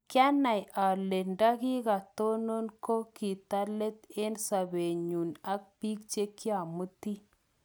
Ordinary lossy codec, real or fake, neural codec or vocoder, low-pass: none; real; none; none